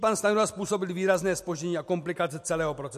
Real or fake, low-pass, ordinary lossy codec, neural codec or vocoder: real; 14.4 kHz; MP3, 64 kbps; none